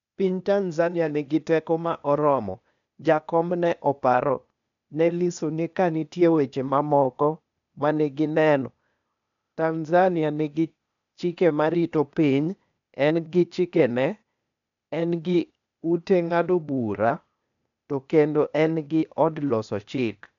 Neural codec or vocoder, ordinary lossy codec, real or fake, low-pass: codec, 16 kHz, 0.8 kbps, ZipCodec; MP3, 96 kbps; fake; 7.2 kHz